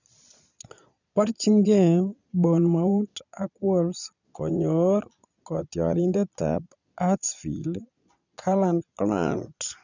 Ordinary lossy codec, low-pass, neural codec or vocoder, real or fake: none; 7.2 kHz; vocoder, 22.05 kHz, 80 mel bands, Vocos; fake